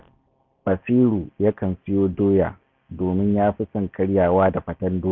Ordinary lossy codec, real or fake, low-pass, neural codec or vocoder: none; real; 7.2 kHz; none